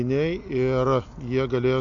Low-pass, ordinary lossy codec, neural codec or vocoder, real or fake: 7.2 kHz; MP3, 48 kbps; none; real